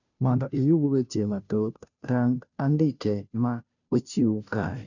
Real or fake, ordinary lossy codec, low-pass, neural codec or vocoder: fake; none; 7.2 kHz; codec, 16 kHz, 0.5 kbps, FunCodec, trained on Chinese and English, 25 frames a second